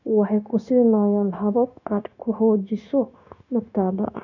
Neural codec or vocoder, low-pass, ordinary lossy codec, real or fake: codec, 16 kHz, 0.9 kbps, LongCat-Audio-Codec; 7.2 kHz; none; fake